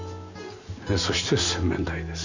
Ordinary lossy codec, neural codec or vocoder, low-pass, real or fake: none; none; 7.2 kHz; real